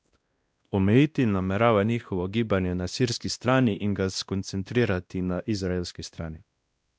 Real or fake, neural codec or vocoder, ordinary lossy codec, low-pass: fake; codec, 16 kHz, 1 kbps, X-Codec, WavLM features, trained on Multilingual LibriSpeech; none; none